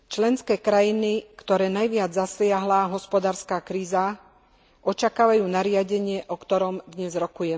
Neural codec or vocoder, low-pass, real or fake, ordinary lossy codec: none; none; real; none